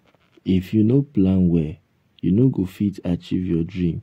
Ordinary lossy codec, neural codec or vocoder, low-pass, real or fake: AAC, 48 kbps; none; 19.8 kHz; real